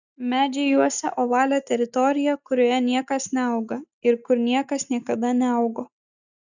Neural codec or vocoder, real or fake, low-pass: none; real; 7.2 kHz